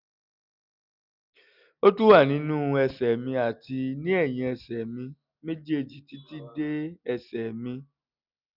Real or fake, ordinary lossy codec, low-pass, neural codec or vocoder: real; none; 5.4 kHz; none